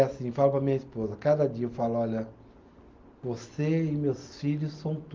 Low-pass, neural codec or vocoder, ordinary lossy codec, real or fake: 7.2 kHz; none; Opus, 32 kbps; real